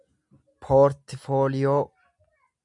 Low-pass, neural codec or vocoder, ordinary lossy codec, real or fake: 10.8 kHz; none; MP3, 96 kbps; real